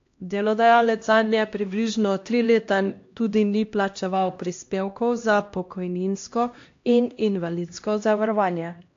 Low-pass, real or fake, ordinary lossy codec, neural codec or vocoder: 7.2 kHz; fake; AAC, 48 kbps; codec, 16 kHz, 1 kbps, X-Codec, HuBERT features, trained on LibriSpeech